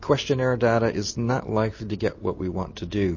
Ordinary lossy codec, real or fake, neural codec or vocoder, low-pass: MP3, 32 kbps; real; none; 7.2 kHz